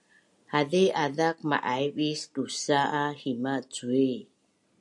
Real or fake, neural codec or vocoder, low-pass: real; none; 10.8 kHz